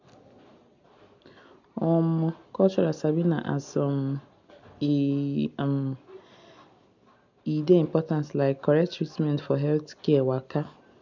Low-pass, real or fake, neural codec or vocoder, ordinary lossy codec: 7.2 kHz; real; none; none